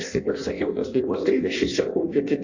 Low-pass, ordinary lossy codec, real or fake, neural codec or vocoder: 7.2 kHz; AAC, 48 kbps; fake; codec, 16 kHz in and 24 kHz out, 0.6 kbps, FireRedTTS-2 codec